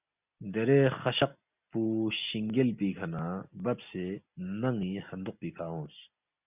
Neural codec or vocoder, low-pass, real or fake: none; 3.6 kHz; real